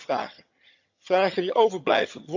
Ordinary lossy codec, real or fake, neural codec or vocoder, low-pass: none; fake; vocoder, 22.05 kHz, 80 mel bands, HiFi-GAN; 7.2 kHz